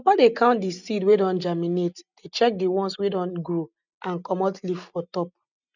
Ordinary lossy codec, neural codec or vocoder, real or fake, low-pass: none; none; real; 7.2 kHz